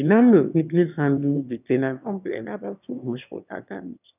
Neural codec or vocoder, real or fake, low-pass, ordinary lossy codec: autoencoder, 22.05 kHz, a latent of 192 numbers a frame, VITS, trained on one speaker; fake; 3.6 kHz; none